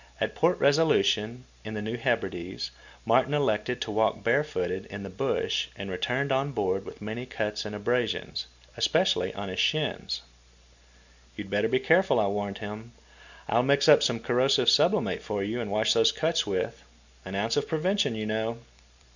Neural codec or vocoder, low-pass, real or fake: none; 7.2 kHz; real